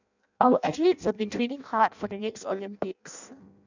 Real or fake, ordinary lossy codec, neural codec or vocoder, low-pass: fake; none; codec, 16 kHz in and 24 kHz out, 0.6 kbps, FireRedTTS-2 codec; 7.2 kHz